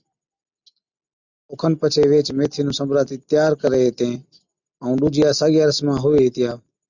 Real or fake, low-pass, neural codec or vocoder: real; 7.2 kHz; none